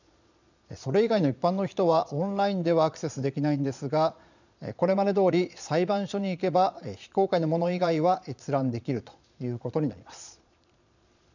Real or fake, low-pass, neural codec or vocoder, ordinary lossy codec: fake; 7.2 kHz; vocoder, 22.05 kHz, 80 mel bands, Vocos; none